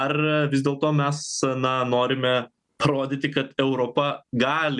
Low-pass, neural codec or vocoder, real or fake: 10.8 kHz; none; real